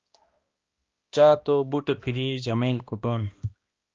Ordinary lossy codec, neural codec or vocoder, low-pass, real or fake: Opus, 32 kbps; codec, 16 kHz, 1 kbps, X-Codec, HuBERT features, trained on balanced general audio; 7.2 kHz; fake